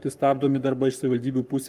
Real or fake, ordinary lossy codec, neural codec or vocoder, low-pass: fake; Opus, 32 kbps; codec, 44.1 kHz, 7.8 kbps, Pupu-Codec; 14.4 kHz